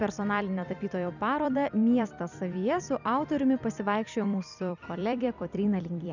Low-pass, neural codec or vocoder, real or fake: 7.2 kHz; vocoder, 44.1 kHz, 128 mel bands every 256 samples, BigVGAN v2; fake